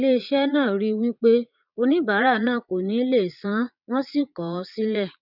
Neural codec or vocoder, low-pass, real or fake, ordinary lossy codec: vocoder, 22.05 kHz, 80 mel bands, Vocos; 5.4 kHz; fake; none